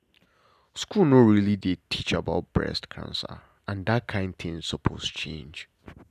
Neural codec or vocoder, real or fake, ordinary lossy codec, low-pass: none; real; none; 14.4 kHz